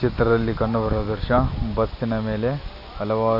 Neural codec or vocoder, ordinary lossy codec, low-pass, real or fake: none; none; 5.4 kHz; real